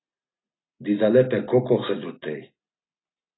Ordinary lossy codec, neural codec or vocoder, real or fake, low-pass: AAC, 16 kbps; none; real; 7.2 kHz